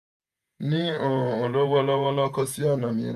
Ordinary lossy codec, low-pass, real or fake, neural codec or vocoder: none; 14.4 kHz; fake; vocoder, 48 kHz, 128 mel bands, Vocos